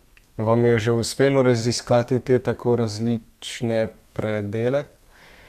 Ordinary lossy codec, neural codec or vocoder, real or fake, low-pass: none; codec, 32 kHz, 1.9 kbps, SNAC; fake; 14.4 kHz